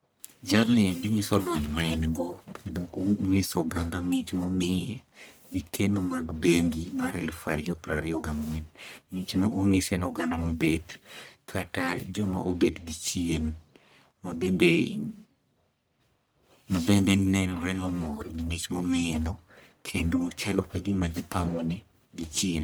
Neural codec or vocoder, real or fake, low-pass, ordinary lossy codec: codec, 44.1 kHz, 1.7 kbps, Pupu-Codec; fake; none; none